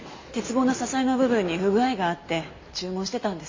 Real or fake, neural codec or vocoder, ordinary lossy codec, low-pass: real; none; MP3, 32 kbps; 7.2 kHz